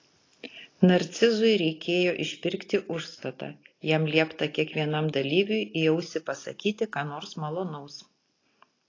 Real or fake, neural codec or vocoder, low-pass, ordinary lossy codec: real; none; 7.2 kHz; AAC, 32 kbps